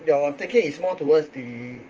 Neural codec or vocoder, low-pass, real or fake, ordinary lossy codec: vocoder, 44.1 kHz, 128 mel bands, Pupu-Vocoder; 7.2 kHz; fake; Opus, 24 kbps